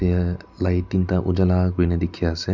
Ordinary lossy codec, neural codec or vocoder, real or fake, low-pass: none; none; real; 7.2 kHz